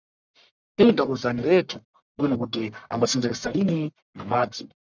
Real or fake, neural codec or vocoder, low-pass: fake; codec, 44.1 kHz, 1.7 kbps, Pupu-Codec; 7.2 kHz